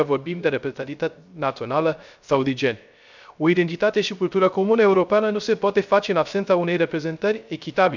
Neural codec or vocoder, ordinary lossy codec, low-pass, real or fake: codec, 16 kHz, 0.3 kbps, FocalCodec; none; 7.2 kHz; fake